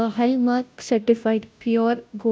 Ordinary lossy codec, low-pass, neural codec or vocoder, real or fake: none; none; codec, 16 kHz, 0.5 kbps, FunCodec, trained on Chinese and English, 25 frames a second; fake